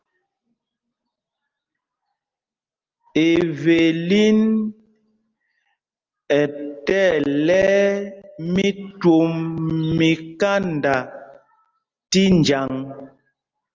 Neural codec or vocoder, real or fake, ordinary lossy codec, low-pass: none; real; Opus, 32 kbps; 7.2 kHz